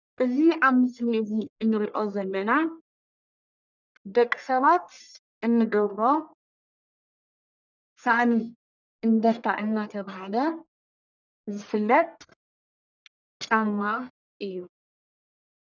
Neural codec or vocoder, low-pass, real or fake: codec, 44.1 kHz, 1.7 kbps, Pupu-Codec; 7.2 kHz; fake